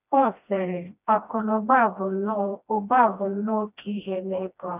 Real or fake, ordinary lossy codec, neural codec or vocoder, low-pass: fake; none; codec, 16 kHz, 1 kbps, FreqCodec, smaller model; 3.6 kHz